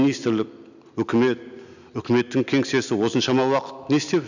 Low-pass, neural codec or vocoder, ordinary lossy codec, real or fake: 7.2 kHz; none; none; real